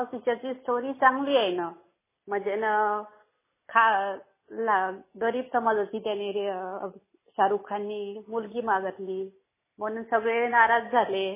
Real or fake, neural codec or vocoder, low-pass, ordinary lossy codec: real; none; 3.6 kHz; MP3, 16 kbps